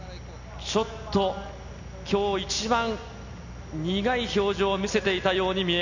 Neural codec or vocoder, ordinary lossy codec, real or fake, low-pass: none; none; real; 7.2 kHz